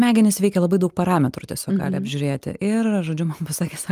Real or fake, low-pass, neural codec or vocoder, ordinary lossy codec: real; 14.4 kHz; none; Opus, 32 kbps